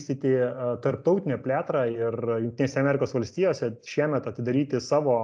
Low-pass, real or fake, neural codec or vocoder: 9.9 kHz; real; none